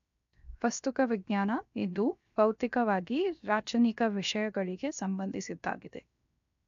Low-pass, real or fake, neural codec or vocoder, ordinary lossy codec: 7.2 kHz; fake; codec, 16 kHz, 0.7 kbps, FocalCodec; AAC, 96 kbps